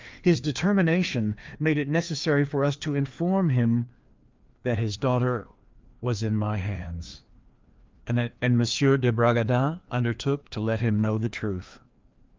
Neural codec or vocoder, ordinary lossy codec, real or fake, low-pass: codec, 16 kHz, 2 kbps, FreqCodec, larger model; Opus, 32 kbps; fake; 7.2 kHz